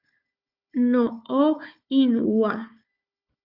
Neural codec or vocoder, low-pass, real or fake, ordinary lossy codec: codec, 16 kHz, 4 kbps, FunCodec, trained on Chinese and English, 50 frames a second; 5.4 kHz; fake; Opus, 64 kbps